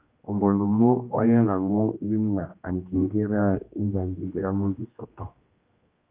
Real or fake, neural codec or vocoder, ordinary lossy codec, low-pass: fake; codec, 16 kHz, 1 kbps, X-Codec, HuBERT features, trained on general audio; Opus, 32 kbps; 3.6 kHz